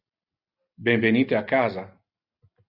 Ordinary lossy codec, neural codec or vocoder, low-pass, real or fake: Opus, 64 kbps; none; 5.4 kHz; real